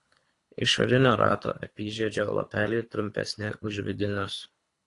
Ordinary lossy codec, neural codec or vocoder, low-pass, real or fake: AAC, 48 kbps; codec, 24 kHz, 3 kbps, HILCodec; 10.8 kHz; fake